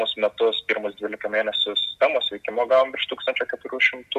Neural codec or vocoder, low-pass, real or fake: none; 14.4 kHz; real